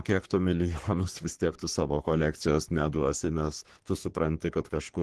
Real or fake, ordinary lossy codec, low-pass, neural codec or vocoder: fake; Opus, 16 kbps; 10.8 kHz; codec, 44.1 kHz, 3.4 kbps, Pupu-Codec